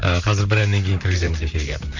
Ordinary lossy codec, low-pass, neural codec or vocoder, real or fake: none; 7.2 kHz; vocoder, 44.1 kHz, 128 mel bands, Pupu-Vocoder; fake